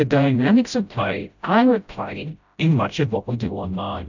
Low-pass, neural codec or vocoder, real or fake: 7.2 kHz; codec, 16 kHz, 0.5 kbps, FreqCodec, smaller model; fake